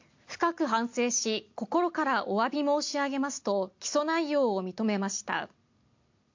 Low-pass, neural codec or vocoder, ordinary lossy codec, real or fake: 7.2 kHz; none; MP3, 48 kbps; real